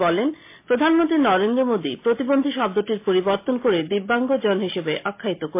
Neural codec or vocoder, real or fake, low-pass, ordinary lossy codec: none; real; 3.6 kHz; MP3, 24 kbps